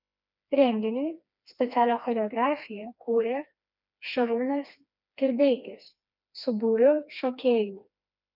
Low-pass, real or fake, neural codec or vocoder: 5.4 kHz; fake; codec, 16 kHz, 2 kbps, FreqCodec, smaller model